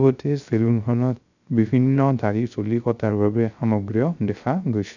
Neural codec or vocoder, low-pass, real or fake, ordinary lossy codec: codec, 16 kHz, 0.3 kbps, FocalCodec; 7.2 kHz; fake; none